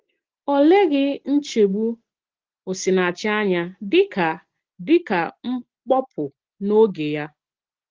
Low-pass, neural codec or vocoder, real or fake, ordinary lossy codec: 7.2 kHz; none; real; Opus, 16 kbps